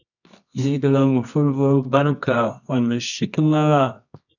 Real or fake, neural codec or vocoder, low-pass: fake; codec, 24 kHz, 0.9 kbps, WavTokenizer, medium music audio release; 7.2 kHz